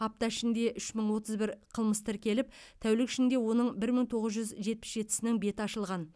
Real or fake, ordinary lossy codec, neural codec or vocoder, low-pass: real; none; none; none